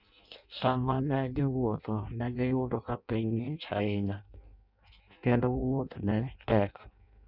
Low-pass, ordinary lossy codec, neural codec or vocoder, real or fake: 5.4 kHz; none; codec, 16 kHz in and 24 kHz out, 0.6 kbps, FireRedTTS-2 codec; fake